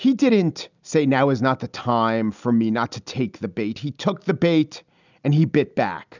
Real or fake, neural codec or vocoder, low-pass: real; none; 7.2 kHz